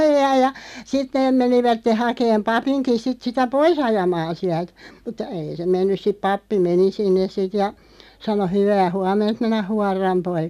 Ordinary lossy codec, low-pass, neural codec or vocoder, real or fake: none; 14.4 kHz; none; real